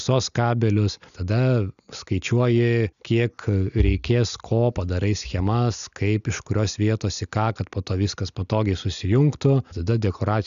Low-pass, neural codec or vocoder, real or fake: 7.2 kHz; none; real